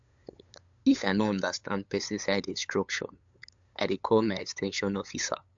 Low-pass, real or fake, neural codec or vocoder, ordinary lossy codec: 7.2 kHz; fake; codec, 16 kHz, 8 kbps, FunCodec, trained on LibriTTS, 25 frames a second; AAC, 64 kbps